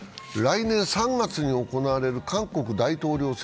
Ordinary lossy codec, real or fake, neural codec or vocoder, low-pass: none; real; none; none